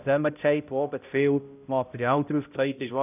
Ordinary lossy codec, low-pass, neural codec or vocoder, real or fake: none; 3.6 kHz; codec, 16 kHz, 0.5 kbps, X-Codec, HuBERT features, trained on balanced general audio; fake